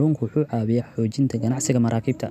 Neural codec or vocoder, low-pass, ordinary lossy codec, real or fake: none; 14.4 kHz; none; real